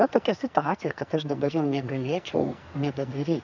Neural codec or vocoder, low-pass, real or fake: codec, 44.1 kHz, 2.6 kbps, SNAC; 7.2 kHz; fake